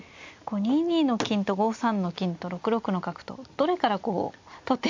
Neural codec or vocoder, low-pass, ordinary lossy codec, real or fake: none; 7.2 kHz; MP3, 48 kbps; real